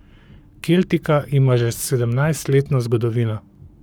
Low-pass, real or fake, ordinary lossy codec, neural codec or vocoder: none; fake; none; codec, 44.1 kHz, 7.8 kbps, Pupu-Codec